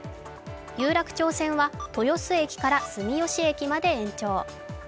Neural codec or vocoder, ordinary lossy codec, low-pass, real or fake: none; none; none; real